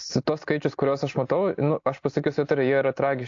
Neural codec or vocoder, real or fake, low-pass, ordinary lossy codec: none; real; 7.2 kHz; AAC, 48 kbps